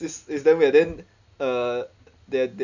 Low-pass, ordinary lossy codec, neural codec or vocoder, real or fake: 7.2 kHz; none; none; real